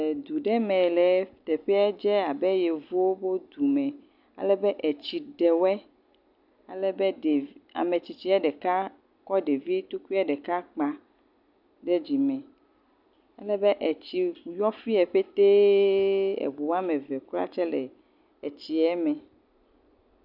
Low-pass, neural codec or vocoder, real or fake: 5.4 kHz; none; real